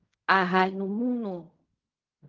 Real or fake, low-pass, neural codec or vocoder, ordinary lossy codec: fake; 7.2 kHz; codec, 16 kHz in and 24 kHz out, 0.4 kbps, LongCat-Audio-Codec, fine tuned four codebook decoder; Opus, 32 kbps